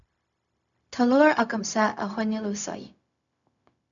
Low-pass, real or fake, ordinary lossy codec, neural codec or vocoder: 7.2 kHz; fake; MP3, 96 kbps; codec, 16 kHz, 0.4 kbps, LongCat-Audio-Codec